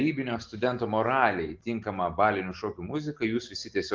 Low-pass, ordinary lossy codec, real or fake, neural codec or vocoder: 7.2 kHz; Opus, 16 kbps; real; none